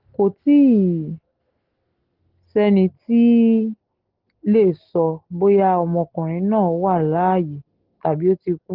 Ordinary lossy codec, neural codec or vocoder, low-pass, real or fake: Opus, 16 kbps; none; 5.4 kHz; real